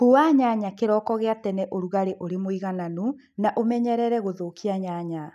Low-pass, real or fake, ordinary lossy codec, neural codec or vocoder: 14.4 kHz; real; none; none